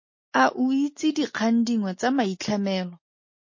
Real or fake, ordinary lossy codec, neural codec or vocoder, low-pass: real; MP3, 32 kbps; none; 7.2 kHz